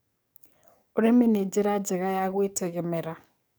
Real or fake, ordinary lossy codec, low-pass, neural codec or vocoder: fake; none; none; codec, 44.1 kHz, 7.8 kbps, DAC